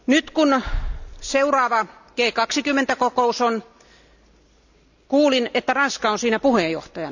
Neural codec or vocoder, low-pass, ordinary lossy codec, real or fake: none; 7.2 kHz; none; real